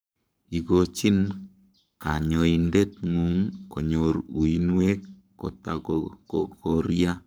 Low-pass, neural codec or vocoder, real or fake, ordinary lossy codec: none; codec, 44.1 kHz, 7.8 kbps, Pupu-Codec; fake; none